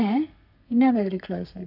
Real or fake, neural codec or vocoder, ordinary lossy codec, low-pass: fake; codec, 32 kHz, 1.9 kbps, SNAC; none; 5.4 kHz